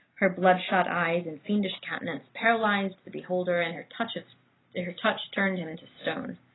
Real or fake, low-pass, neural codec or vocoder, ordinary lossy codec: real; 7.2 kHz; none; AAC, 16 kbps